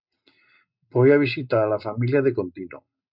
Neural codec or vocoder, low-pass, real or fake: none; 5.4 kHz; real